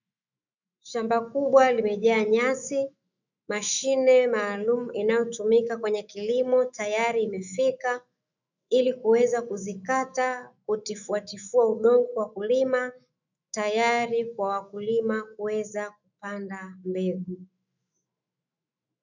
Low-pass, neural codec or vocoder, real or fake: 7.2 kHz; autoencoder, 48 kHz, 128 numbers a frame, DAC-VAE, trained on Japanese speech; fake